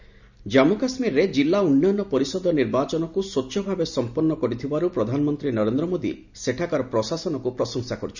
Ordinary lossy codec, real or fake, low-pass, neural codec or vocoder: none; real; 7.2 kHz; none